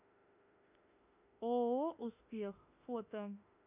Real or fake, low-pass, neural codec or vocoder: fake; 3.6 kHz; autoencoder, 48 kHz, 32 numbers a frame, DAC-VAE, trained on Japanese speech